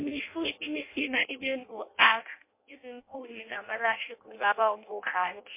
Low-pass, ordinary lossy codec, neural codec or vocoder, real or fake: 3.6 kHz; MP3, 24 kbps; codec, 16 kHz in and 24 kHz out, 0.6 kbps, FireRedTTS-2 codec; fake